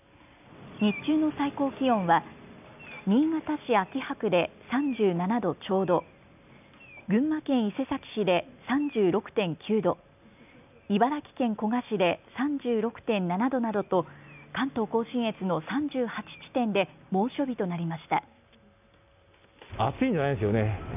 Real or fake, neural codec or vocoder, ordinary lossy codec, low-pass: real; none; none; 3.6 kHz